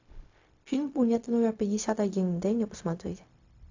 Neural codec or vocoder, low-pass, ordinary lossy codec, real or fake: codec, 16 kHz, 0.4 kbps, LongCat-Audio-Codec; 7.2 kHz; AAC, 48 kbps; fake